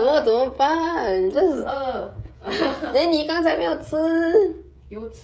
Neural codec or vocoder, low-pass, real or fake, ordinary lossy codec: codec, 16 kHz, 16 kbps, FreqCodec, smaller model; none; fake; none